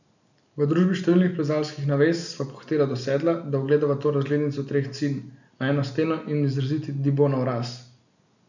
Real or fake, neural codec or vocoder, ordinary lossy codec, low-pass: real; none; none; 7.2 kHz